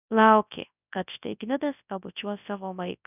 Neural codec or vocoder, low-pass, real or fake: codec, 24 kHz, 0.9 kbps, WavTokenizer, large speech release; 3.6 kHz; fake